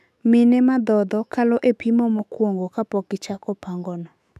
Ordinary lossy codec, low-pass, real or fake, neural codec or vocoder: none; 14.4 kHz; fake; autoencoder, 48 kHz, 128 numbers a frame, DAC-VAE, trained on Japanese speech